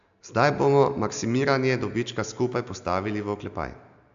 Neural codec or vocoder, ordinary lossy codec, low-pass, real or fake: none; AAC, 96 kbps; 7.2 kHz; real